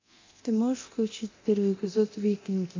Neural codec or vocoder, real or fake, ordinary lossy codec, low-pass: codec, 24 kHz, 0.9 kbps, DualCodec; fake; MP3, 48 kbps; 7.2 kHz